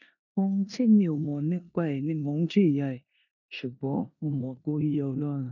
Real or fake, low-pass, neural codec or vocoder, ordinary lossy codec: fake; 7.2 kHz; codec, 16 kHz in and 24 kHz out, 0.9 kbps, LongCat-Audio-Codec, four codebook decoder; none